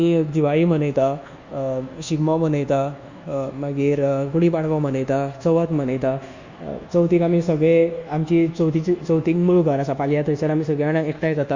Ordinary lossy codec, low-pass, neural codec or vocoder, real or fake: Opus, 64 kbps; 7.2 kHz; codec, 24 kHz, 1.2 kbps, DualCodec; fake